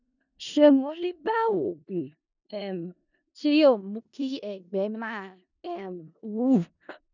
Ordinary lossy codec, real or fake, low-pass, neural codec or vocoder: none; fake; 7.2 kHz; codec, 16 kHz in and 24 kHz out, 0.4 kbps, LongCat-Audio-Codec, four codebook decoder